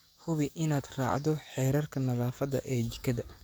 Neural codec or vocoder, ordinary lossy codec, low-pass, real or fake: codec, 44.1 kHz, 7.8 kbps, DAC; none; none; fake